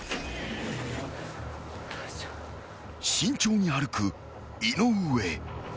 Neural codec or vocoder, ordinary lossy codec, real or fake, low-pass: none; none; real; none